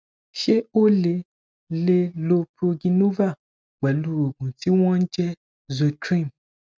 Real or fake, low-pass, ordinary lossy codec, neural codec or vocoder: real; none; none; none